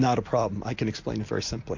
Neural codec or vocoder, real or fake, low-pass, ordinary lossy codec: none; real; 7.2 kHz; AAC, 48 kbps